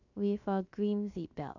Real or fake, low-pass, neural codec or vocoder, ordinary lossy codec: fake; 7.2 kHz; codec, 16 kHz, 0.7 kbps, FocalCodec; none